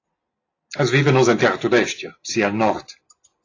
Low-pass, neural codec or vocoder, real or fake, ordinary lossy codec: 7.2 kHz; none; real; AAC, 32 kbps